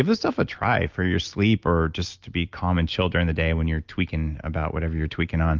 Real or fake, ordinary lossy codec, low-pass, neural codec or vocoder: real; Opus, 24 kbps; 7.2 kHz; none